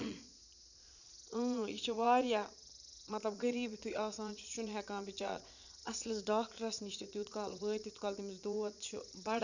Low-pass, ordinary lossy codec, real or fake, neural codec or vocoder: 7.2 kHz; none; fake; vocoder, 22.05 kHz, 80 mel bands, Vocos